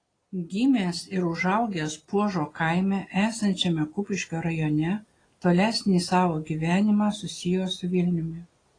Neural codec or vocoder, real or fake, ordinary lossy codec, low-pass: none; real; AAC, 32 kbps; 9.9 kHz